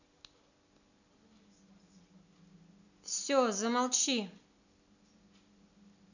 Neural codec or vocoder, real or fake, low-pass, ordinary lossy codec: none; real; 7.2 kHz; none